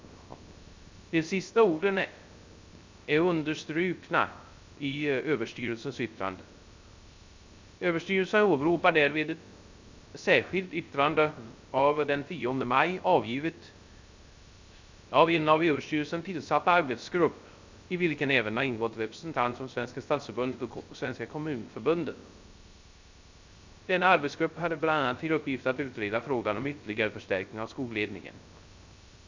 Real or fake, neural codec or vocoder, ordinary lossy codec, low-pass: fake; codec, 16 kHz, 0.3 kbps, FocalCodec; MP3, 64 kbps; 7.2 kHz